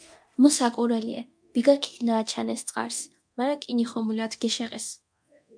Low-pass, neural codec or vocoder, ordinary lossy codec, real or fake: 9.9 kHz; codec, 24 kHz, 0.9 kbps, DualCodec; MP3, 64 kbps; fake